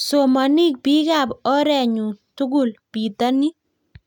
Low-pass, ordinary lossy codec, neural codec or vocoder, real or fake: 19.8 kHz; none; none; real